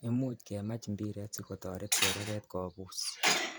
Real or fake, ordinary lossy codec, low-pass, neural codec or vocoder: fake; none; none; vocoder, 44.1 kHz, 128 mel bands every 512 samples, BigVGAN v2